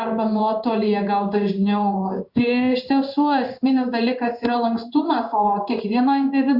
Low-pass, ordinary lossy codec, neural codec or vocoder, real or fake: 5.4 kHz; Opus, 64 kbps; codec, 16 kHz in and 24 kHz out, 1 kbps, XY-Tokenizer; fake